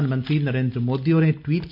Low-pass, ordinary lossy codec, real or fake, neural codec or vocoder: 5.4 kHz; MP3, 48 kbps; real; none